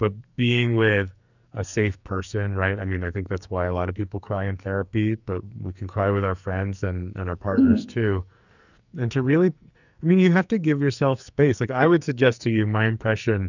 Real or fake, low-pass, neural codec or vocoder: fake; 7.2 kHz; codec, 44.1 kHz, 2.6 kbps, SNAC